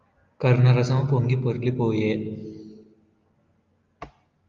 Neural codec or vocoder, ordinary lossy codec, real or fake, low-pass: none; Opus, 32 kbps; real; 7.2 kHz